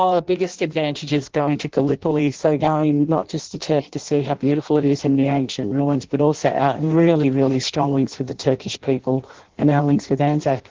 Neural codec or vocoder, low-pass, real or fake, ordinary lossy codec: codec, 16 kHz in and 24 kHz out, 0.6 kbps, FireRedTTS-2 codec; 7.2 kHz; fake; Opus, 16 kbps